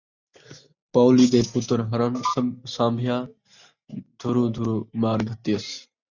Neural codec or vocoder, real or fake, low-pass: none; real; 7.2 kHz